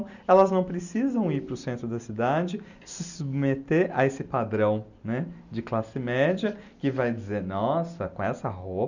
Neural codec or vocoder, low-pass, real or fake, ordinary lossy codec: none; 7.2 kHz; real; none